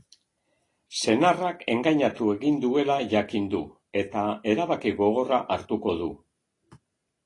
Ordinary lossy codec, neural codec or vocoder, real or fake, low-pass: AAC, 32 kbps; none; real; 10.8 kHz